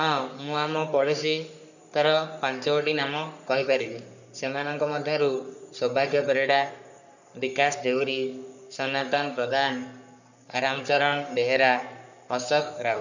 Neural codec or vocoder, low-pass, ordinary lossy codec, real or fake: codec, 44.1 kHz, 3.4 kbps, Pupu-Codec; 7.2 kHz; none; fake